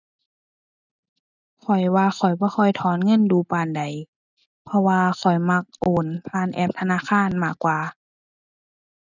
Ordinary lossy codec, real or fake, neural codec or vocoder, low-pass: none; real; none; 7.2 kHz